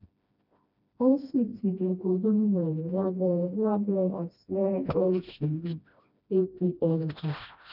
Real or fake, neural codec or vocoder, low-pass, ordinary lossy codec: fake; codec, 16 kHz, 1 kbps, FreqCodec, smaller model; 5.4 kHz; none